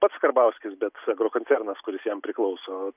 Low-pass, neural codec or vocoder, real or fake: 3.6 kHz; none; real